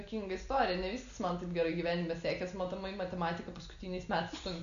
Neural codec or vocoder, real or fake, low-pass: none; real; 7.2 kHz